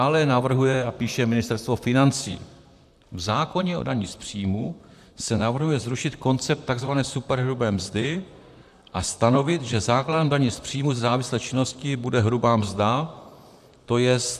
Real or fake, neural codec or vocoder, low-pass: fake; vocoder, 44.1 kHz, 128 mel bands, Pupu-Vocoder; 14.4 kHz